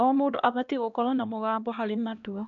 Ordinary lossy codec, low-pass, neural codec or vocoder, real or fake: none; 7.2 kHz; codec, 16 kHz, 1 kbps, X-Codec, HuBERT features, trained on LibriSpeech; fake